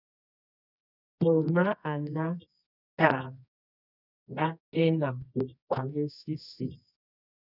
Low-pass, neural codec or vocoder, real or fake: 5.4 kHz; codec, 24 kHz, 0.9 kbps, WavTokenizer, medium music audio release; fake